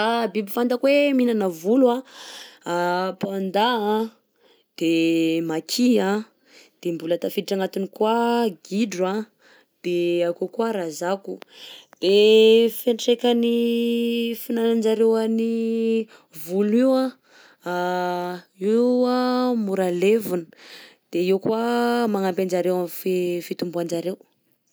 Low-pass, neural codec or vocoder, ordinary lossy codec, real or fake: none; none; none; real